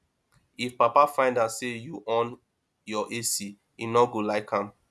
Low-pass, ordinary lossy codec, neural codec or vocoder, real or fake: none; none; none; real